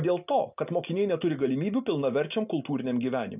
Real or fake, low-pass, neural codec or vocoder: real; 3.6 kHz; none